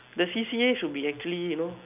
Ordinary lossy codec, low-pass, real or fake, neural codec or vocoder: none; 3.6 kHz; real; none